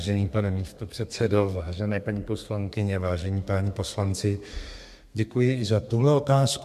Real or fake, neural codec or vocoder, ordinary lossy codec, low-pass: fake; codec, 32 kHz, 1.9 kbps, SNAC; MP3, 96 kbps; 14.4 kHz